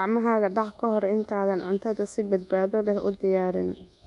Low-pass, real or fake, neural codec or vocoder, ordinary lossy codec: 10.8 kHz; fake; codec, 24 kHz, 1.2 kbps, DualCodec; none